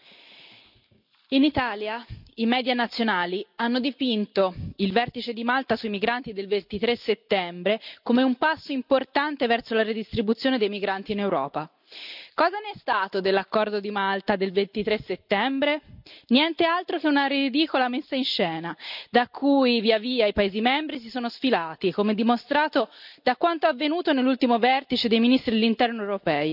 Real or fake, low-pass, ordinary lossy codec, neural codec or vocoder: real; 5.4 kHz; none; none